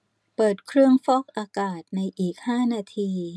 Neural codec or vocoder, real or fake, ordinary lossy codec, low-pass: none; real; none; none